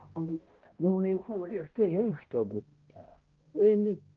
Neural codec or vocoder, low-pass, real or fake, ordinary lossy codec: codec, 16 kHz, 0.5 kbps, X-Codec, HuBERT features, trained on balanced general audio; 7.2 kHz; fake; Opus, 16 kbps